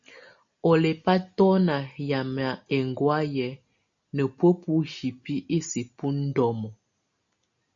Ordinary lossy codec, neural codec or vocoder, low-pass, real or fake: AAC, 64 kbps; none; 7.2 kHz; real